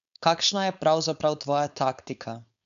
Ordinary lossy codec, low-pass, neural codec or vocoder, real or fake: none; 7.2 kHz; codec, 16 kHz, 4.8 kbps, FACodec; fake